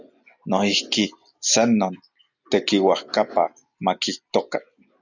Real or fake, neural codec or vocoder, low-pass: real; none; 7.2 kHz